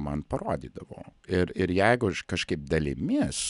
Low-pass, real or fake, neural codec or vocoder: 10.8 kHz; real; none